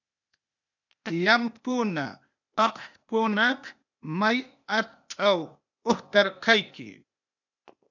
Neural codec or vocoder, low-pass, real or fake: codec, 16 kHz, 0.8 kbps, ZipCodec; 7.2 kHz; fake